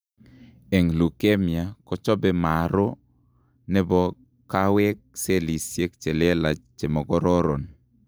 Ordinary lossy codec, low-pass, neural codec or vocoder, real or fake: none; none; none; real